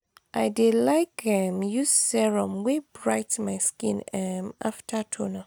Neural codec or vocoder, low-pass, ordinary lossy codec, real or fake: none; none; none; real